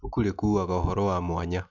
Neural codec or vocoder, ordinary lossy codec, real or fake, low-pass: none; none; real; 7.2 kHz